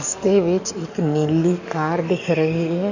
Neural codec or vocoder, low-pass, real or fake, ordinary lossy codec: codec, 44.1 kHz, 7.8 kbps, DAC; 7.2 kHz; fake; none